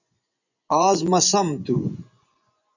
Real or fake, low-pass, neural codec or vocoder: fake; 7.2 kHz; vocoder, 24 kHz, 100 mel bands, Vocos